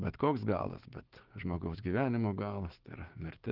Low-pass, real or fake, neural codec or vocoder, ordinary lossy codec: 5.4 kHz; fake; codec, 16 kHz, 6 kbps, DAC; Opus, 16 kbps